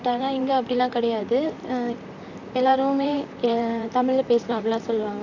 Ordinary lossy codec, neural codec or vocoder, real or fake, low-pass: none; vocoder, 44.1 kHz, 128 mel bands, Pupu-Vocoder; fake; 7.2 kHz